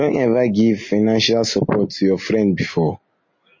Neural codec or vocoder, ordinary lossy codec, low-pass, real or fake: none; MP3, 32 kbps; 7.2 kHz; real